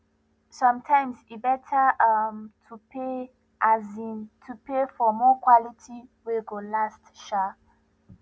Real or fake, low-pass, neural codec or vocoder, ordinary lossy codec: real; none; none; none